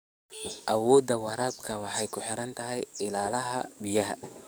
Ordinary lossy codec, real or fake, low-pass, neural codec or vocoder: none; fake; none; codec, 44.1 kHz, 7.8 kbps, DAC